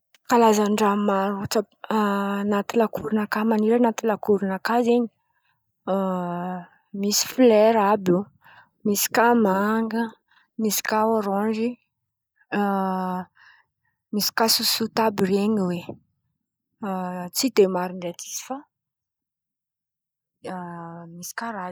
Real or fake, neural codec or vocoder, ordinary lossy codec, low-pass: real; none; none; none